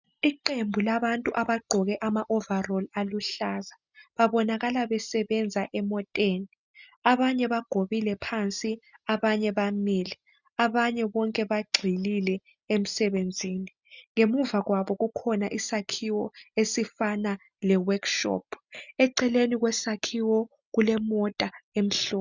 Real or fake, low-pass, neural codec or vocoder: real; 7.2 kHz; none